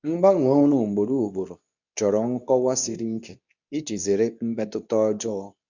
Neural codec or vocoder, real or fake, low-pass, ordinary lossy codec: codec, 24 kHz, 0.9 kbps, WavTokenizer, medium speech release version 2; fake; 7.2 kHz; none